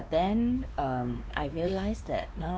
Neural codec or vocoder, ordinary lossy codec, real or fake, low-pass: codec, 16 kHz, 2 kbps, X-Codec, WavLM features, trained on Multilingual LibriSpeech; none; fake; none